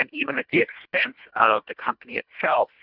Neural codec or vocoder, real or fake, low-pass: codec, 24 kHz, 1.5 kbps, HILCodec; fake; 5.4 kHz